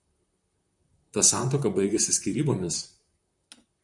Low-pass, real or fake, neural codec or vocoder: 10.8 kHz; fake; vocoder, 44.1 kHz, 128 mel bands, Pupu-Vocoder